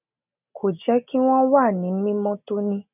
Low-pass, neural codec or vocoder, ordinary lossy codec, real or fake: 3.6 kHz; none; none; real